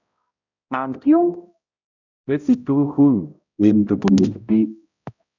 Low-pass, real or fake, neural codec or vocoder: 7.2 kHz; fake; codec, 16 kHz, 0.5 kbps, X-Codec, HuBERT features, trained on balanced general audio